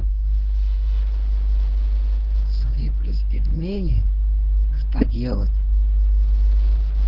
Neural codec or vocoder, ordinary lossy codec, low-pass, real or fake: codec, 24 kHz, 0.9 kbps, WavTokenizer, medium speech release version 1; Opus, 16 kbps; 5.4 kHz; fake